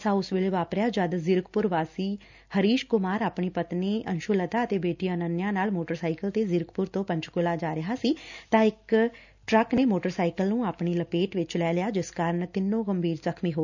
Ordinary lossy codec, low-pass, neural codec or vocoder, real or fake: none; 7.2 kHz; none; real